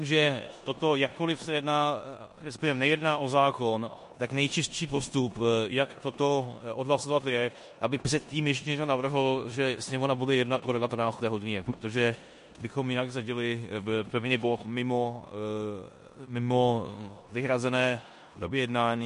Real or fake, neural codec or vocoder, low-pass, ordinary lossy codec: fake; codec, 16 kHz in and 24 kHz out, 0.9 kbps, LongCat-Audio-Codec, four codebook decoder; 10.8 kHz; MP3, 48 kbps